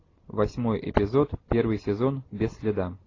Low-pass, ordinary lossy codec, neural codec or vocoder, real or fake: 7.2 kHz; AAC, 32 kbps; none; real